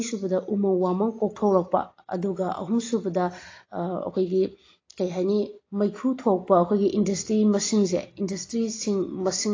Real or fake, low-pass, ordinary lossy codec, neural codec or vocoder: real; 7.2 kHz; AAC, 32 kbps; none